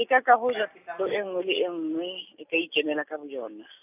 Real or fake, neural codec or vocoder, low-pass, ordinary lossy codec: real; none; 3.6 kHz; none